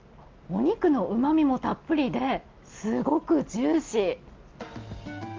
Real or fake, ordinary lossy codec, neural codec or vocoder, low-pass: real; Opus, 32 kbps; none; 7.2 kHz